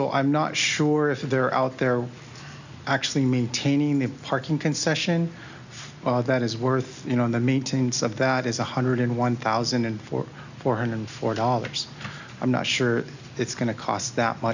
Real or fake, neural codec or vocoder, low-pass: real; none; 7.2 kHz